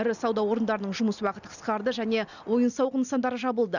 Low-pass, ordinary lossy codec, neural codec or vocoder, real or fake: 7.2 kHz; none; none; real